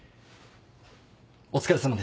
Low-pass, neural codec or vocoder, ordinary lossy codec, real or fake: none; none; none; real